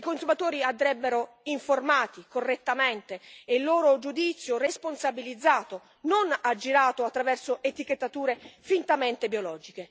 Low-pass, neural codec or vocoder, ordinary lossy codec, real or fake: none; none; none; real